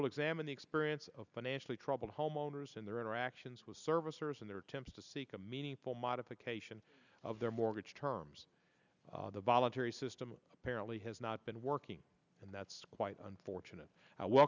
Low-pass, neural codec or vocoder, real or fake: 7.2 kHz; none; real